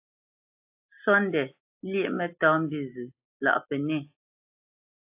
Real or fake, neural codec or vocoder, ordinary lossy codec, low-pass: real; none; AAC, 32 kbps; 3.6 kHz